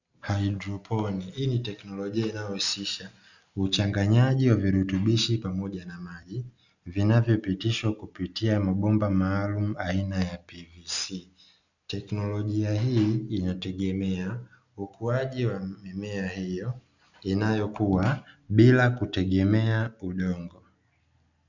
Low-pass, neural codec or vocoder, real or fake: 7.2 kHz; none; real